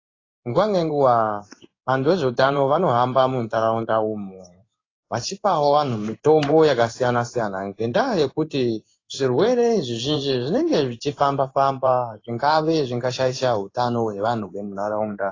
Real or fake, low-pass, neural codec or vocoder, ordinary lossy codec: fake; 7.2 kHz; codec, 16 kHz in and 24 kHz out, 1 kbps, XY-Tokenizer; AAC, 32 kbps